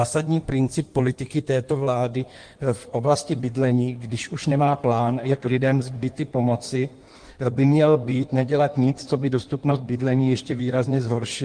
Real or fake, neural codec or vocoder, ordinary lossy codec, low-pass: fake; codec, 16 kHz in and 24 kHz out, 1.1 kbps, FireRedTTS-2 codec; Opus, 24 kbps; 9.9 kHz